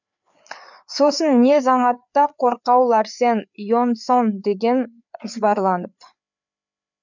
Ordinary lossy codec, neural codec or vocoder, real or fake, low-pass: none; codec, 16 kHz, 4 kbps, FreqCodec, larger model; fake; 7.2 kHz